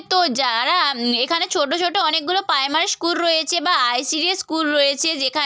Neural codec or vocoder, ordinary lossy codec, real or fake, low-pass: none; none; real; none